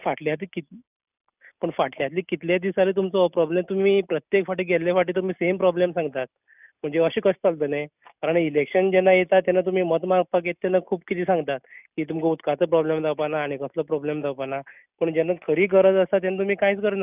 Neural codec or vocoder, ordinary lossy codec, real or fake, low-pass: none; none; real; 3.6 kHz